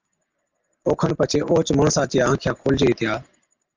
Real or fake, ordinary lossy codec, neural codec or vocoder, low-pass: real; Opus, 32 kbps; none; 7.2 kHz